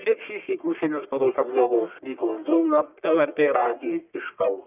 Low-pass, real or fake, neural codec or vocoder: 3.6 kHz; fake; codec, 44.1 kHz, 1.7 kbps, Pupu-Codec